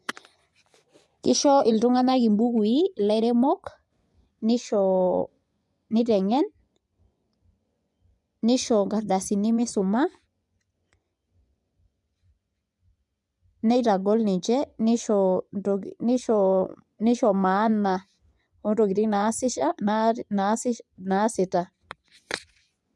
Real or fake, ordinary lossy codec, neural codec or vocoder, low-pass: fake; none; vocoder, 24 kHz, 100 mel bands, Vocos; none